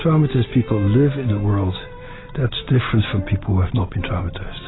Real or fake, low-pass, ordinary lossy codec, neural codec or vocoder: real; 7.2 kHz; AAC, 16 kbps; none